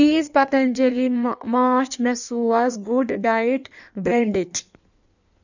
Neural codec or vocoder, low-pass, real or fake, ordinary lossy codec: codec, 16 kHz in and 24 kHz out, 1.1 kbps, FireRedTTS-2 codec; 7.2 kHz; fake; none